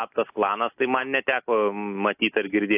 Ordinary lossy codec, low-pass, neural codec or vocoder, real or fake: AAC, 32 kbps; 3.6 kHz; none; real